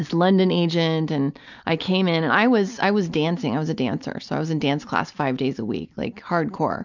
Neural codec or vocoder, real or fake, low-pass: none; real; 7.2 kHz